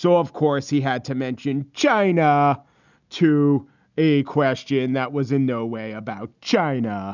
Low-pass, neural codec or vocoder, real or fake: 7.2 kHz; none; real